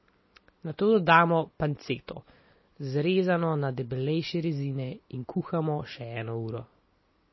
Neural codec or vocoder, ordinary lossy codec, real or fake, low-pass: none; MP3, 24 kbps; real; 7.2 kHz